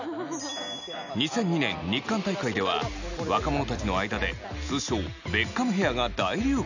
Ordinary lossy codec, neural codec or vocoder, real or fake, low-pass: none; none; real; 7.2 kHz